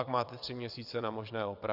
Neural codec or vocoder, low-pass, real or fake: vocoder, 22.05 kHz, 80 mel bands, WaveNeXt; 5.4 kHz; fake